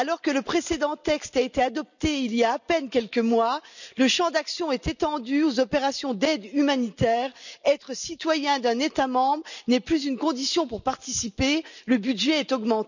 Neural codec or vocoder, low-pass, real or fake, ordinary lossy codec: none; 7.2 kHz; real; none